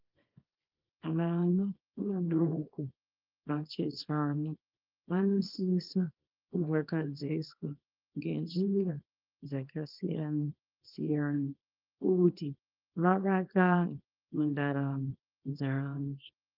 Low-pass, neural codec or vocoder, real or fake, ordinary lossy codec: 5.4 kHz; codec, 24 kHz, 0.9 kbps, WavTokenizer, small release; fake; Opus, 32 kbps